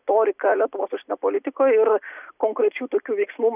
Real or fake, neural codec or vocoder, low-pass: real; none; 3.6 kHz